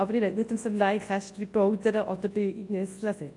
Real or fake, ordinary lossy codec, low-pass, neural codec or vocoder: fake; AAC, 48 kbps; 10.8 kHz; codec, 24 kHz, 0.9 kbps, WavTokenizer, large speech release